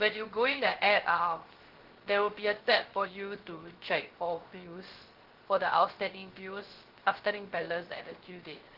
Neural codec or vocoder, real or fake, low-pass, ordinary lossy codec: codec, 16 kHz, 0.3 kbps, FocalCodec; fake; 5.4 kHz; Opus, 16 kbps